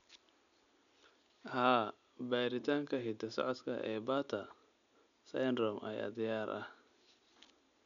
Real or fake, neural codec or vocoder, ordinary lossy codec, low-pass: real; none; none; 7.2 kHz